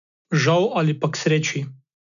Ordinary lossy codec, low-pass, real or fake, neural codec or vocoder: none; 7.2 kHz; real; none